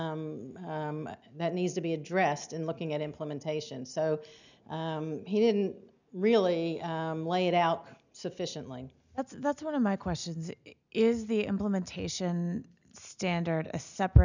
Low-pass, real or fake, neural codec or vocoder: 7.2 kHz; real; none